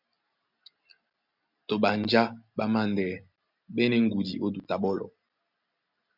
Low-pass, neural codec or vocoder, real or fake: 5.4 kHz; none; real